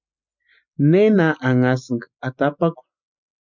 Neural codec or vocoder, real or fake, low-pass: none; real; 7.2 kHz